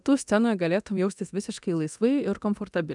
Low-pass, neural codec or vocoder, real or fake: 10.8 kHz; codec, 24 kHz, 0.9 kbps, DualCodec; fake